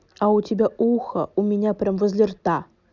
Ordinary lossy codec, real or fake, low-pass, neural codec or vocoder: none; real; 7.2 kHz; none